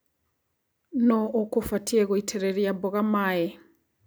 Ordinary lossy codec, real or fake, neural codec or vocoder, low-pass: none; real; none; none